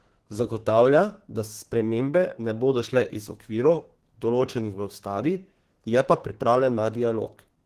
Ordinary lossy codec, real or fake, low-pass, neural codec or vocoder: Opus, 16 kbps; fake; 14.4 kHz; codec, 32 kHz, 1.9 kbps, SNAC